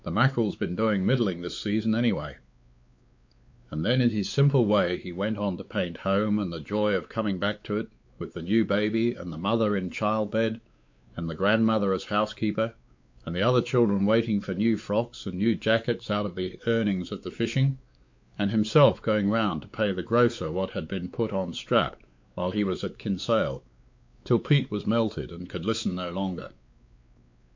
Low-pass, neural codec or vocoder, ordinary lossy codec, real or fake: 7.2 kHz; codec, 16 kHz, 4 kbps, X-Codec, WavLM features, trained on Multilingual LibriSpeech; MP3, 48 kbps; fake